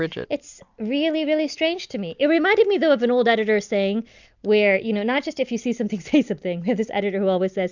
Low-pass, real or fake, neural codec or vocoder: 7.2 kHz; real; none